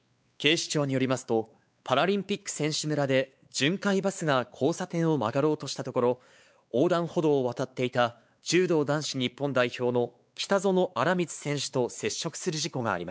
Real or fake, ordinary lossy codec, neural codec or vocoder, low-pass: fake; none; codec, 16 kHz, 4 kbps, X-Codec, WavLM features, trained on Multilingual LibriSpeech; none